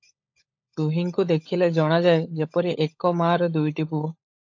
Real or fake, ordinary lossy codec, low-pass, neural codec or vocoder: fake; AAC, 48 kbps; 7.2 kHz; codec, 16 kHz, 16 kbps, FunCodec, trained on LibriTTS, 50 frames a second